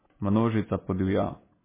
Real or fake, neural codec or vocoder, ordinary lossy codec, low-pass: real; none; MP3, 16 kbps; 3.6 kHz